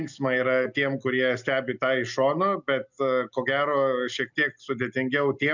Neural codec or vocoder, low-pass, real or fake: none; 7.2 kHz; real